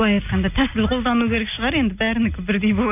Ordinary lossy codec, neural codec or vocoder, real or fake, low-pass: none; none; real; 3.6 kHz